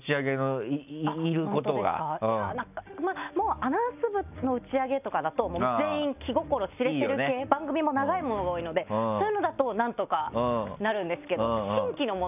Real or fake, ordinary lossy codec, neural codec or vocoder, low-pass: real; none; none; 3.6 kHz